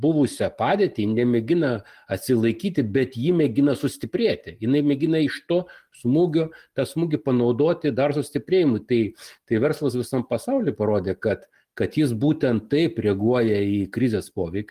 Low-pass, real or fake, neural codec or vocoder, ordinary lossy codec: 14.4 kHz; real; none; Opus, 24 kbps